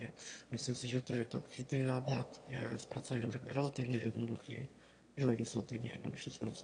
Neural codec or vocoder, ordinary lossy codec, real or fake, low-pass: autoencoder, 22.05 kHz, a latent of 192 numbers a frame, VITS, trained on one speaker; AAC, 48 kbps; fake; 9.9 kHz